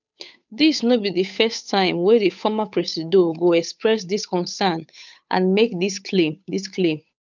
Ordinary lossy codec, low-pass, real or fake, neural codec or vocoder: none; 7.2 kHz; fake; codec, 16 kHz, 8 kbps, FunCodec, trained on Chinese and English, 25 frames a second